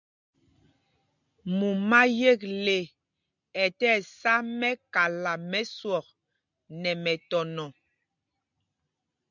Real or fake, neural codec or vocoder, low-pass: real; none; 7.2 kHz